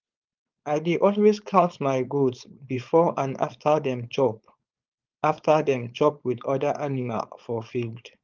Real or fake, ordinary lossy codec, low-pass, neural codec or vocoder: fake; Opus, 24 kbps; 7.2 kHz; codec, 16 kHz, 4.8 kbps, FACodec